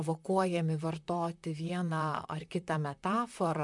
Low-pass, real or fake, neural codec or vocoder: 10.8 kHz; fake; vocoder, 44.1 kHz, 128 mel bands, Pupu-Vocoder